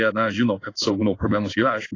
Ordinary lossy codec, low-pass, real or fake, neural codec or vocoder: AAC, 32 kbps; 7.2 kHz; fake; codec, 16 kHz in and 24 kHz out, 1 kbps, XY-Tokenizer